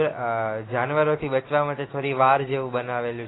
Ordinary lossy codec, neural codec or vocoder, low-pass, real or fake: AAC, 16 kbps; none; 7.2 kHz; real